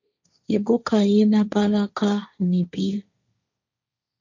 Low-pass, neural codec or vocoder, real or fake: 7.2 kHz; codec, 16 kHz, 1.1 kbps, Voila-Tokenizer; fake